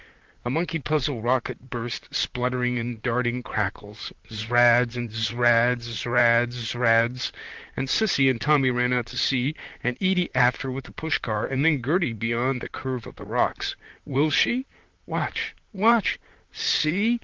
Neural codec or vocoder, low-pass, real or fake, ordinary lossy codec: vocoder, 44.1 kHz, 128 mel bands, Pupu-Vocoder; 7.2 kHz; fake; Opus, 16 kbps